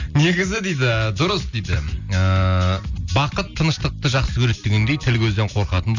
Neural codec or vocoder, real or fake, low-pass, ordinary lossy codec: none; real; 7.2 kHz; none